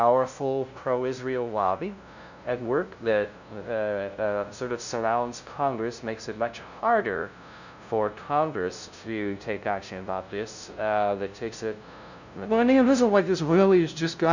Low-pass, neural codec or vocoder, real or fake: 7.2 kHz; codec, 16 kHz, 0.5 kbps, FunCodec, trained on LibriTTS, 25 frames a second; fake